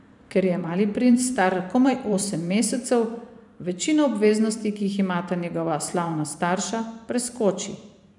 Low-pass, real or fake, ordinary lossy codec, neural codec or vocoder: 10.8 kHz; fake; none; vocoder, 44.1 kHz, 128 mel bands every 512 samples, BigVGAN v2